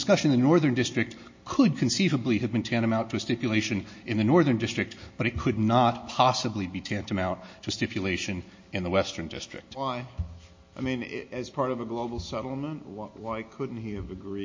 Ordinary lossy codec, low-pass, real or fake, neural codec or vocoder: MP3, 32 kbps; 7.2 kHz; real; none